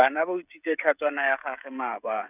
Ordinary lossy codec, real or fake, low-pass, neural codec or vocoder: none; fake; 3.6 kHz; codec, 16 kHz, 16 kbps, FreqCodec, smaller model